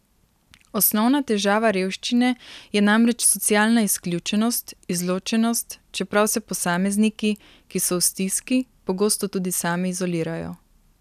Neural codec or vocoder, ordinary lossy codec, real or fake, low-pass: none; none; real; 14.4 kHz